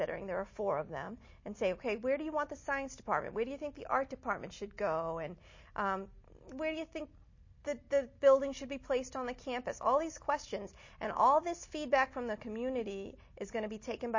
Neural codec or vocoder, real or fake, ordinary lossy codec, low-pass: none; real; MP3, 32 kbps; 7.2 kHz